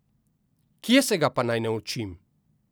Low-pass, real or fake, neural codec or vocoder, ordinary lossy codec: none; real; none; none